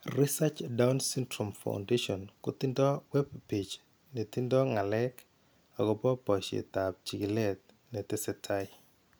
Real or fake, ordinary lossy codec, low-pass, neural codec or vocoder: real; none; none; none